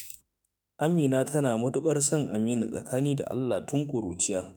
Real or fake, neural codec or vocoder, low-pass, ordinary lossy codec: fake; autoencoder, 48 kHz, 32 numbers a frame, DAC-VAE, trained on Japanese speech; none; none